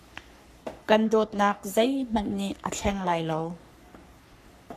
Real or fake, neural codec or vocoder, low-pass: fake; codec, 44.1 kHz, 3.4 kbps, Pupu-Codec; 14.4 kHz